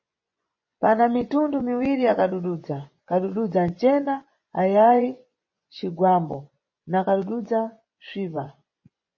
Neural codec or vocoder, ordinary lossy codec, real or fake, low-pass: vocoder, 24 kHz, 100 mel bands, Vocos; MP3, 32 kbps; fake; 7.2 kHz